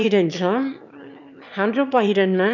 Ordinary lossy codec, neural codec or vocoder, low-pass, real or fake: none; autoencoder, 22.05 kHz, a latent of 192 numbers a frame, VITS, trained on one speaker; 7.2 kHz; fake